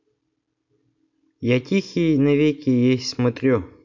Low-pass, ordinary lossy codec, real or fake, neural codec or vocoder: 7.2 kHz; MP3, 48 kbps; real; none